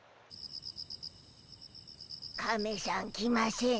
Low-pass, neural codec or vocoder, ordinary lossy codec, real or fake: none; none; none; real